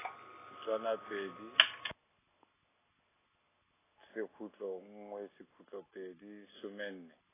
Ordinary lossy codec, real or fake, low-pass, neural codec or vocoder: AAC, 16 kbps; real; 3.6 kHz; none